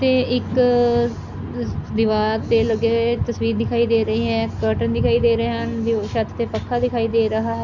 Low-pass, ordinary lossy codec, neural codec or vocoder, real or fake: 7.2 kHz; none; none; real